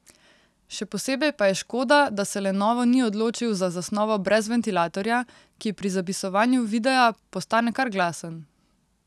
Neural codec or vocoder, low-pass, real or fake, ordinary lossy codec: none; none; real; none